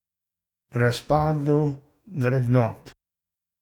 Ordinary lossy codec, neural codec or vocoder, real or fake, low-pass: none; codec, 44.1 kHz, 2.6 kbps, DAC; fake; 19.8 kHz